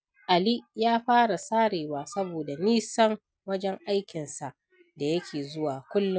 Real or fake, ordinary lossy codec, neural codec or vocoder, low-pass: real; none; none; none